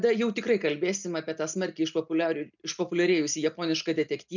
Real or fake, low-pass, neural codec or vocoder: real; 7.2 kHz; none